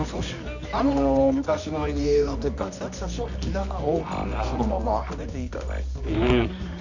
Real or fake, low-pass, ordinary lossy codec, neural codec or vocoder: fake; 7.2 kHz; none; codec, 24 kHz, 0.9 kbps, WavTokenizer, medium music audio release